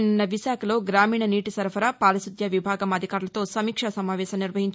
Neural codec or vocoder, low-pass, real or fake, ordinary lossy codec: none; none; real; none